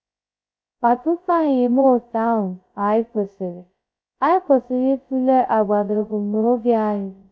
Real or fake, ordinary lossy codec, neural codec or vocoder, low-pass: fake; none; codec, 16 kHz, 0.2 kbps, FocalCodec; none